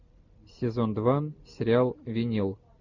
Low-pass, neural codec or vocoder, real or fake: 7.2 kHz; none; real